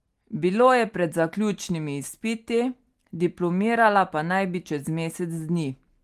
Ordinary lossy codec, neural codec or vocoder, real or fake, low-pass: Opus, 24 kbps; none; real; 14.4 kHz